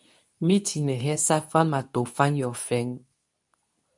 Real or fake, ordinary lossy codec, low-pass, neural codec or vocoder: fake; MP3, 96 kbps; 10.8 kHz; codec, 24 kHz, 0.9 kbps, WavTokenizer, medium speech release version 2